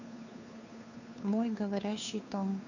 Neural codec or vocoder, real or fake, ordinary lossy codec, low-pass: codec, 16 kHz, 2 kbps, FunCodec, trained on Chinese and English, 25 frames a second; fake; none; 7.2 kHz